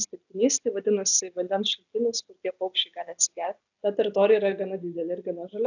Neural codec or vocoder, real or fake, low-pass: none; real; 7.2 kHz